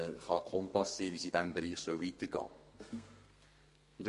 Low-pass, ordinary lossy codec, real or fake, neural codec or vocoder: 14.4 kHz; MP3, 48 kbps; fake; codec, 32 kHz, 1.9 kbps, SNAC